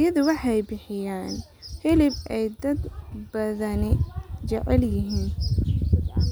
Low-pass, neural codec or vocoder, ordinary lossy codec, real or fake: none; none; none; real